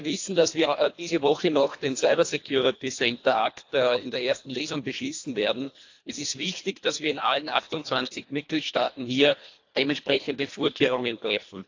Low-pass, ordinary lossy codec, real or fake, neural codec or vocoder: 7.2 kHz; AAC, 48 kbps; fake; codec, 24 kHz, 1.5 kbps, HILCodec